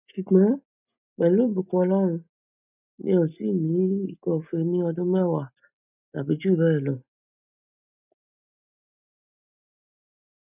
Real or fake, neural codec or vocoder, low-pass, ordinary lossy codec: real; none; 3.6 kHz; none